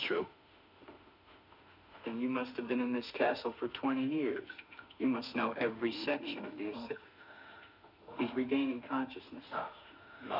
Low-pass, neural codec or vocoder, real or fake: 5.4 kHz; autoencoder, 48 kHz, 32 numbers a frame, DAC-VAE, trained on Japanese speech; fake